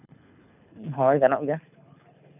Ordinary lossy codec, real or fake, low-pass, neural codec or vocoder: none; fake; 3.6 kHz; codec, 24 kHz, 6 kbps, HILCodec